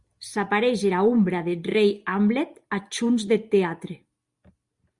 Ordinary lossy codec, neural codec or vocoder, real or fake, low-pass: Opus, 64 kbps; none; real; 10.8 kHz